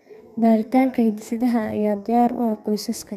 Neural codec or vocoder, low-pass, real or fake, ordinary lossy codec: codec, 32 kHz, 1.9 kbps, SNAC; 14.4 kHz; fake; none